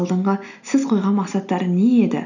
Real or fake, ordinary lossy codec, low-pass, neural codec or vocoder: real; none; 7.2 kHz; none